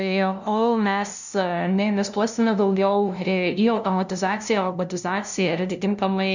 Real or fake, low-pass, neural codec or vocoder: fake; 7.2 kHz; codec, 16 kHz, 0.5 kbps, FunCodec, trained on LibriTTS, 25 frames a second